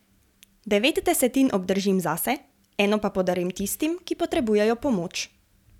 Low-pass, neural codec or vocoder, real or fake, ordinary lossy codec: 19.8 kHz; none; real; none